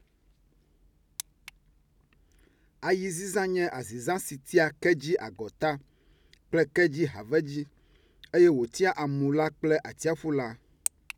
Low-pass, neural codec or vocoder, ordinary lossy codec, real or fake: 19.8 kHz; none; none; real